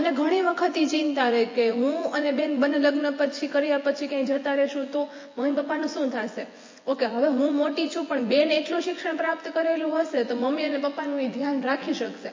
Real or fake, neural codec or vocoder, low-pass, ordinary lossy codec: fake; vocoder, 24 kHz, 100 mel bands, Vocos; 7.2 kHz; MP3, 32 kbps